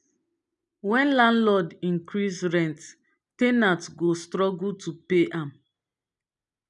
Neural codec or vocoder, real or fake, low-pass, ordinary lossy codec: none; real; 10.8 kHz; none